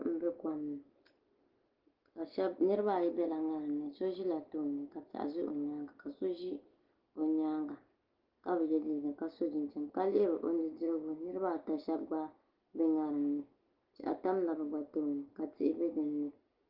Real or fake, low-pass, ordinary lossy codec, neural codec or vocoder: real; 5.4 kHz; Opus, 16 kbps; none